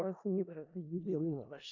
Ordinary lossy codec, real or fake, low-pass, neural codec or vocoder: AAC, 48 kbps; fake; 7.2 kHz; codec, 16 kHz in and 24 kHz out, 0.4 kbps, LongCat-Audio-Codec, four codebook decoder